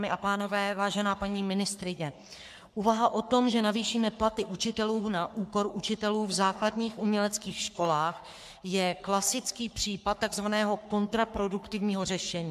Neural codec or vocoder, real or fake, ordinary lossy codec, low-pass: codec, 44.1 kHz, 3.4 kbps, Pupu-Codec; fake; MP3, 96 kbps; 14.4 kHz